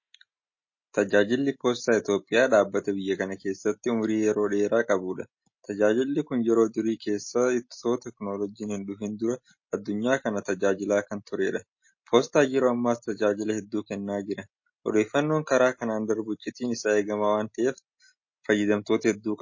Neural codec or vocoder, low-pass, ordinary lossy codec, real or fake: none; 7.2 kHz; MP3, 32 kbps; real